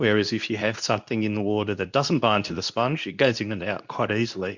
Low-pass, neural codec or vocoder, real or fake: 7.2 kHz; codec, 24 kHz, 0.9 kbps, WavTokenizer, medium speech release version 2; fake